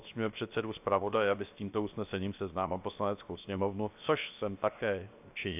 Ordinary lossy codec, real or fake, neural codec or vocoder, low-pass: AAC, 32 kbps; fake; codec, 16 kHz, 0.7 kbps, FocalCodec; 3.6 kHz